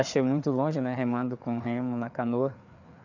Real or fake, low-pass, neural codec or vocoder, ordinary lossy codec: fake; 7.2 kHz; codec, 16 kHz, 4 kbps, FreqCodec, larger model; none